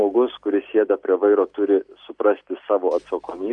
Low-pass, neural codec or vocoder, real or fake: 10.8 kHz; none; real